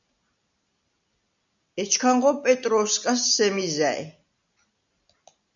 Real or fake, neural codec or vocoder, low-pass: real; none; 7.2 kHz